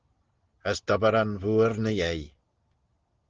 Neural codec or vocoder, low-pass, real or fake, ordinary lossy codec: none; 7.2 kHz; real; Opus, 16 kbps